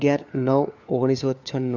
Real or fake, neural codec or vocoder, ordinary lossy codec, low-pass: fake; codec, 16 kHz, 4 kbps, FunCodec, trained on LibriTTS, 50 frames a second; none; 7.2 kHz